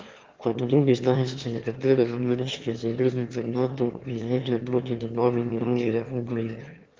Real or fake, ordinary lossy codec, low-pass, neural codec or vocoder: fake; Opus, 32 kbps; 7.2 kHz; autoencoder, 22.05 kHz, a latent of 192 numbers a frame, VITS, trained on one speaker